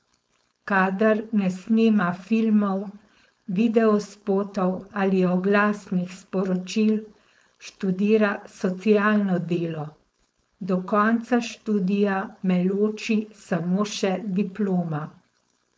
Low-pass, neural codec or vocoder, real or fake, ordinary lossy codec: none; codec, 16 kHz, 4.8 kbps, FACodec; fake; none